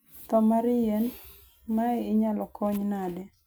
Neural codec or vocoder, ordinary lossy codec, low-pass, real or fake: none; none; none; real